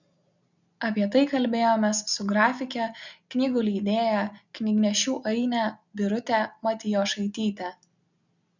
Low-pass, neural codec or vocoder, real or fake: 7.2 kHz; none; real